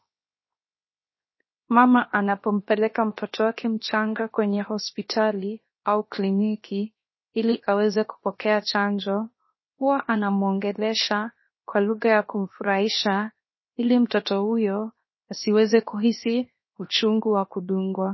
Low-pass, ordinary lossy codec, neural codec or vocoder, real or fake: 7.2 kHz; MP3, 24 kbps; codec, 16 kHz, 0.7 kbps, FocalCodec; fake